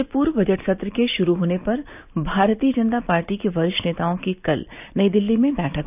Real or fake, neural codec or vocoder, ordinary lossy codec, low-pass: real; none; none; 3.6 kHz